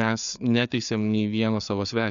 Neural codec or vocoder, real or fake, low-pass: codec, 16 kHz, 4 kbps, FreqCodec, larger model; fake; 7.2 kHz